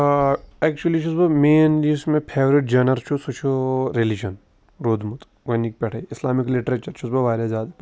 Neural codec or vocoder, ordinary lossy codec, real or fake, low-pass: none; none; real; none